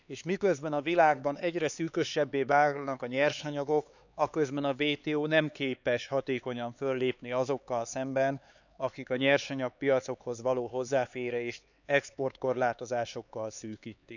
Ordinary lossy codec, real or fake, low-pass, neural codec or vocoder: none; fake; 7.2 kHz; codec, 16 kHz, 4 kbps, X-Codec, HuBERT features, trained on LibriSpeech